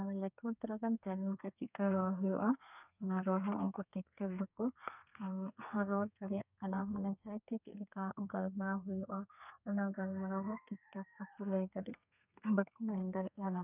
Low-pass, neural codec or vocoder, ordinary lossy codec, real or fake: 3.6 kHz; codec, 32 kHz, 1.9 kbps, SNAC; none; fake